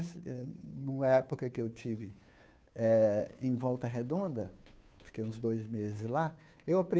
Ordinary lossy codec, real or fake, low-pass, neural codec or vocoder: none; fake; none; codec, 16 kHz, 2 kbps, FunCodec, trained on Chinese and English, 25 frames a second